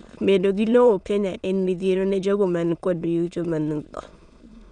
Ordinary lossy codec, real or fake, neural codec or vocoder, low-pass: none; fake; autoencoder, 22.05 kHz, a latent of 192 numbers a frame, VITS, trained on many speakers; 9.9 kHz